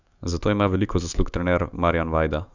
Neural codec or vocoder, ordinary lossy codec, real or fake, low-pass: autoencoder, 48 kHz, 128 numbers a frame, DAC-VAE, trained on Japanese speech; none; fake; 7.2 kHz